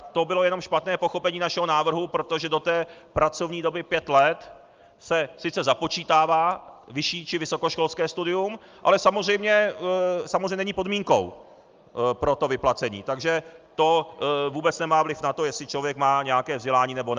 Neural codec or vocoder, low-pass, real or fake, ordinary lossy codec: none; 7.2 kHz; real; Opus, 24 kbps